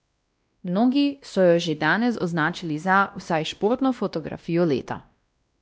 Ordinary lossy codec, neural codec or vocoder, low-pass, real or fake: none; codec, 16 kHz, 1 kbps, X-Codec, WavLM features, trained on Multilingual LibriSpeech; none; fake